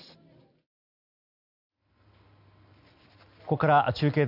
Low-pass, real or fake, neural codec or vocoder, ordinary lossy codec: 5.4 kHz; real; none; none